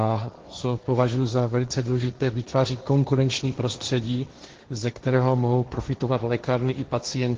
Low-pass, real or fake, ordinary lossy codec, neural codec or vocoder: 7.2 kHz; fake; Opus, 16 kbps; codec, 16 kHz, 1.1 kbps, Voila-Tokenizer